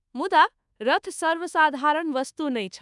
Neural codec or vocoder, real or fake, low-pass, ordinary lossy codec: codec, 24 kHz, 1.2 kbps, DualCodec; fake; 10.8 kHz; none